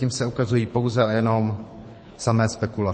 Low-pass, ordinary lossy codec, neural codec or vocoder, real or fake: 9.9 kHz; MP3, 32 kbps; codec, 24 kHz, 6 kbps, HILCodec; fake